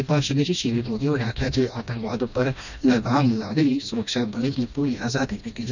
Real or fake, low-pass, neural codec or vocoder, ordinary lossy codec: fake; 7.2 kHz; codec, 16 kHz, 1 kbps, FreqCodec, smaller model; none